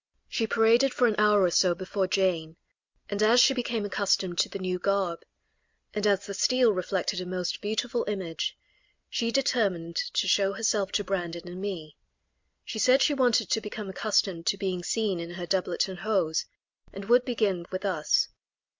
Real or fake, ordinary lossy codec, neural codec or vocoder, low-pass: real; MP3, 64 kbps; none; 7.2 kHz